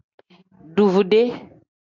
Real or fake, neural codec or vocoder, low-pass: real; none; 7.2 kHz